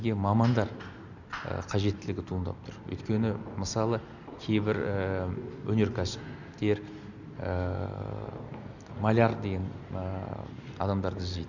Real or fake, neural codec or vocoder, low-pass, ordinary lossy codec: real; none; 7.2 kHz; none